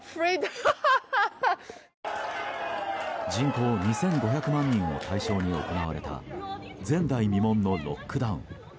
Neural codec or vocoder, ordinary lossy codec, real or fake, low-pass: none; none; real; none